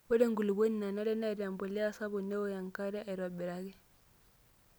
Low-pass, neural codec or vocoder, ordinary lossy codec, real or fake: none; none; none; real